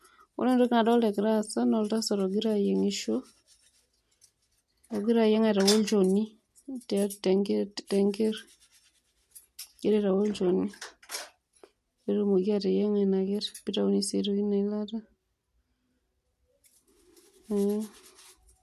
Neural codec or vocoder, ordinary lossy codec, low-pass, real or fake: none; MP3, 64 kbps; 14.4 kHz; real